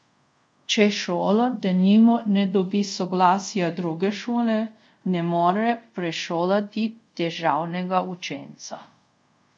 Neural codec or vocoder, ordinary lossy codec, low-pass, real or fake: codec, 24 kHz, 0.5 kbps, DualCodec; none; 9.9 kHz; fake